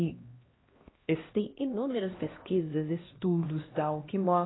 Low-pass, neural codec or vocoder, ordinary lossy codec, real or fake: 7.2 kHz; codec, 16 kHz, 1 kbps, X-Codec, HuBERT features, trained on LibriSpeech; AAC, 16 kbps; fake